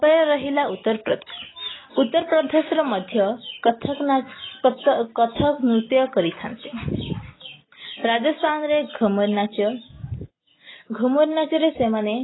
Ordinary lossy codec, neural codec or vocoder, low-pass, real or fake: AAC, 16 kbps; none; 7.2 kHz; real